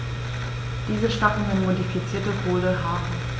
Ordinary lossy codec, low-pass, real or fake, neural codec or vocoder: none; none; real; none